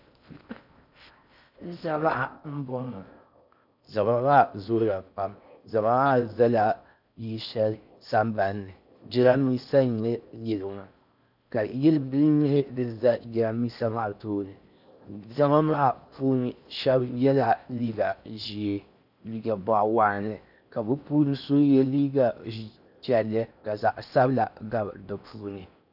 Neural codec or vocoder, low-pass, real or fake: codec, 16 kHz in and 24 kHz out, 0.6 kbps, FocalCodec, streaming, 4096 codes; 5.4 kHz; fake